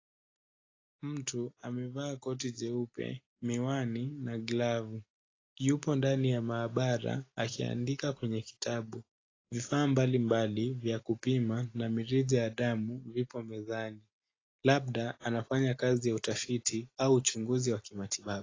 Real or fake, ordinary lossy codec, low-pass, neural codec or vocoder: real; AAC, 32 kbps; 7.2 kHz; none